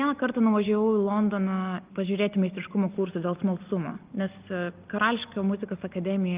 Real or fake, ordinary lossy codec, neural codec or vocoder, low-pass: real; Opus, 24 kbps; none; 3.6 kHz